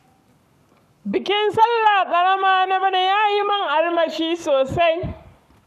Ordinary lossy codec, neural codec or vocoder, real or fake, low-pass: none; codec, 44.1 kHz, 7.8 kbps, Pupu-Codec; fake; 14.4 kHz